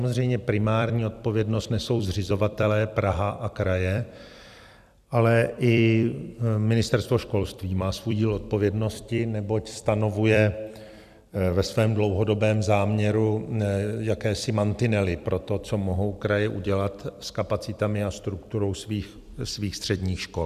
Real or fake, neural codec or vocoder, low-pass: fake; vocoder, 44.1 kHz, 128 mel bands every 256 samples, BigVGAN v2; 14.4 kHz